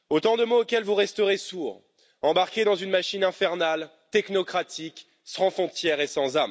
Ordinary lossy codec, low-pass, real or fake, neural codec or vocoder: none; none; real; none